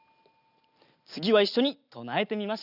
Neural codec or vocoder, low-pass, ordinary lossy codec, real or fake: none; 5.4 kHz; none; real